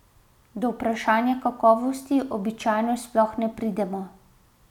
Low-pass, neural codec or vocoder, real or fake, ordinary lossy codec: 19.8 kHz; none; real; none